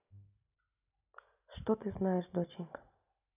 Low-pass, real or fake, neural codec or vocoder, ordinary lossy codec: 3.6 kHz; real; none; none